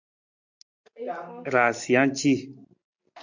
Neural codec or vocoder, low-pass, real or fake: none; 7.2 kHz; real